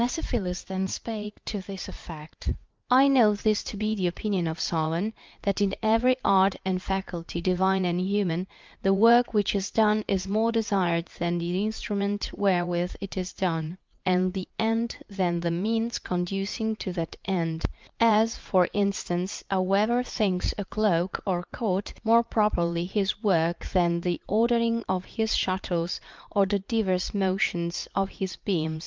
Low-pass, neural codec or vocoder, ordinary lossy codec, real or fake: 7.2 kHz; vocoder, 44.1 kHz, 80 mel bands, Vocos; Opus, 24 kbps; fake